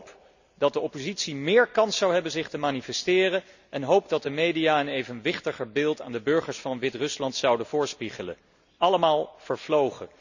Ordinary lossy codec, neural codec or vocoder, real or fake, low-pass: none; none; real; 7.2 kHz